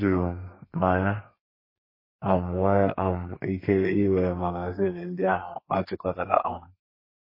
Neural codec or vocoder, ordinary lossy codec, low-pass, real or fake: codec, 32 kHz, 1.9 kbps, SNAC; MP3, 24 kbps; 5.4 kHz; fake